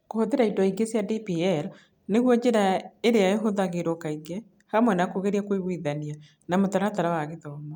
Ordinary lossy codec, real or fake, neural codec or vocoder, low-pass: none; real; none; 19.8 kHz